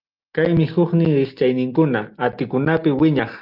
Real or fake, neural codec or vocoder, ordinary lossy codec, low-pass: real; none; Opus, 32 kbps; 5.4 kHz